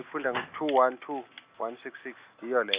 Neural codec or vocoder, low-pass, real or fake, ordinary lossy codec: none; 3.6 kHz; real; Opus, 64 kbps